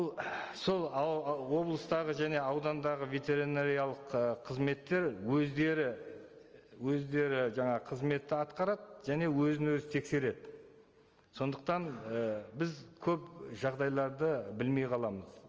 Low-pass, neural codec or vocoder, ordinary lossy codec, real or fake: 7.2 kHz; none; Opus, 24 kbps; real